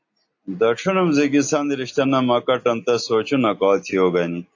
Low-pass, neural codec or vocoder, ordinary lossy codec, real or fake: 7.2 kHz; none; AAC, 48 kbps; real